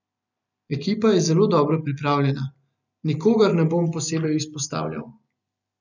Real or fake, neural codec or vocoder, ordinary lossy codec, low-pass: real; none; none; 7.2 kHz